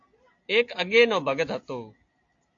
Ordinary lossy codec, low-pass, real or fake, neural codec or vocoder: AAC, 48 kbps; 7.2 kHz; real; none